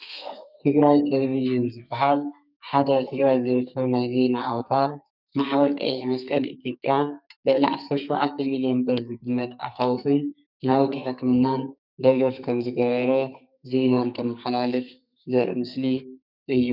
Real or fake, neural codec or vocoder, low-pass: fake; codec, 32 kHz, 1.9 kbps, SNAC; 5.4 kHz